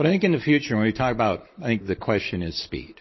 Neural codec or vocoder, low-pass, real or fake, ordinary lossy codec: none; 7.2 kHz; real; MP3, 24 kbps